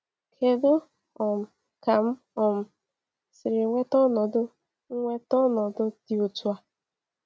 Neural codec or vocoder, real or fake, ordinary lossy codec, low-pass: none; real; none; none